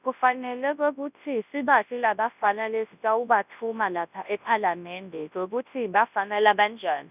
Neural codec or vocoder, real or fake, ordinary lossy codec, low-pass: codec, 24 kHz, 0.9 kbps, WavTokenizer, large speech release; fake; none; 3.6 kHz